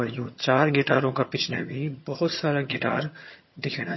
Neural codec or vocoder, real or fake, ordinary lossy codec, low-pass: vocoder, 22.05 kHz, 80 mel bands, HiFi-GAN; fake; MP3, 24 kbps; 7.2 kHz